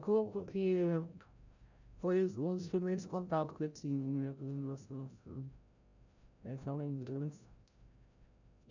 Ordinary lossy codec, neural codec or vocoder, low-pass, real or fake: none; codec, 16 kHz, 0.5 kbps, FreqCodec, larger model; 7.2 kHz; fake